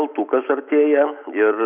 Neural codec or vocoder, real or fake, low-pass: none; real; 3.6 kHz